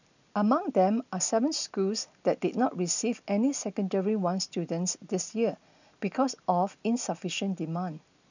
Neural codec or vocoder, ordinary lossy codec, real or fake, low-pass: none; none; real; 7.2 kHz